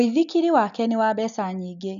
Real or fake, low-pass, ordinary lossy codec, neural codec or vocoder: real; 7.2 kHz; none; none